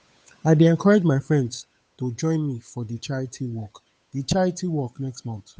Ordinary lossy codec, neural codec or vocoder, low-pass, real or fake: none; codec, 16 kHz, 8 kbps, FunCodec, trained on Chinese and English, 25 frames a second; none; fake